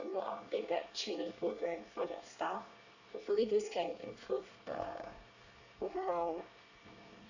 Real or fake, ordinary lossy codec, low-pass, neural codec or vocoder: fake; none; 7.2 kHz; codec, 24 kHz, 1 kbps, SNAC